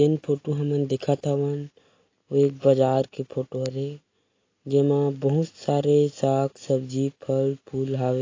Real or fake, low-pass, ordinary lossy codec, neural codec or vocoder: real; 7.2 kHz; AAC, 32 kbps; none